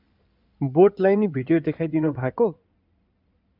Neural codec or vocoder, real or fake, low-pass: codec, 16 kHz in and 24 kHz out, 2.2 kbps, FireRedTTS-2 codec; fake; 5.4 kHz